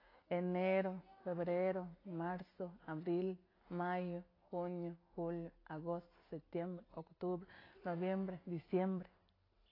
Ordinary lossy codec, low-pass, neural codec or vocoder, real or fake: AAC, 24 kbps; 5.4 kHz; none; real